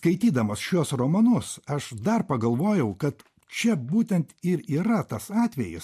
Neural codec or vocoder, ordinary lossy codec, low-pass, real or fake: none; MP3, 64 kbps; 14.4 kHz; real